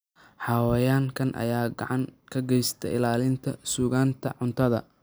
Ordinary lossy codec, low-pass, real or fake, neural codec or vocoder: none; none; real; none